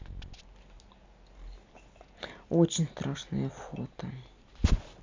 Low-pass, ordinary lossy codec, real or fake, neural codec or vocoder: 7.2 kHz; MP3, 64 kbps; real; none